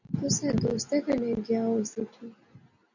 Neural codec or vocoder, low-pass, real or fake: none; 7.2 kHz; real